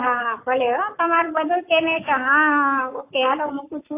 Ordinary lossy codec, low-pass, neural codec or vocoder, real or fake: AAC, 24 kbps; 3.6 kHz; codec, 44.1 kHz, 7.8 kbps, Pupu-Codec; fake